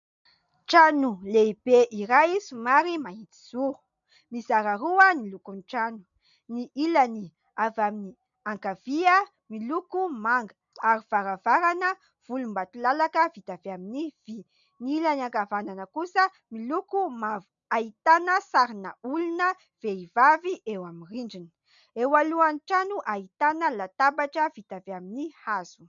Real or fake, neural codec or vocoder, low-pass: real; none; 7.2 kHz